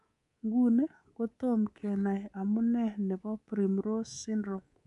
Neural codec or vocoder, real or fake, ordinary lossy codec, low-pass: codec, 24 kHz, 3.1 kbps, DualCodec; fake; none; 10.8 kHz